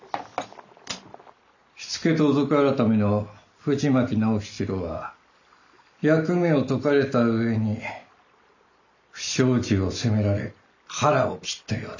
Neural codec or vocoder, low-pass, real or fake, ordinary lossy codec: none; 7.2 kHz; real; none